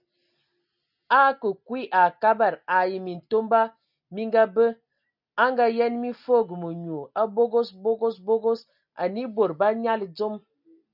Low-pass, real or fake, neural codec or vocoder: 5.4 kHz; real; none